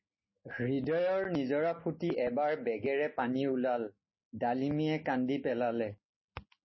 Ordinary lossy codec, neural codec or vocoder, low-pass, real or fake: MP3, 24 kbps; none; 7.2 kHz; real